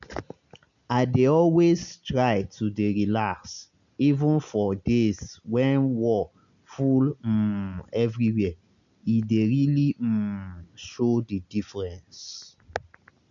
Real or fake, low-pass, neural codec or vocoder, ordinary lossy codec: real; 7.2 kHz; none; none